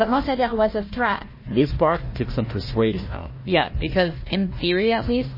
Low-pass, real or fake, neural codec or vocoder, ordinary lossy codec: 5.4 kHz; fake; codec, 16 kHz, 1 kbps, FunCodec, trained on Chinese and English, 50 frames a second; MP3, 24 kbps